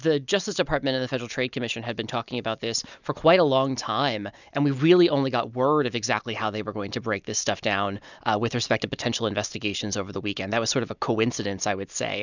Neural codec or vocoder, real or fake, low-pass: none; real; 7.2 kHz